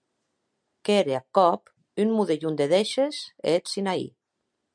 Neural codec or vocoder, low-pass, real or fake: none; 9.9 kHz; real